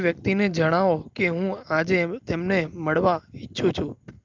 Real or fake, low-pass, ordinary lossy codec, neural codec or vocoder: real; 7.2 kHz; Opus, 32 kbps; none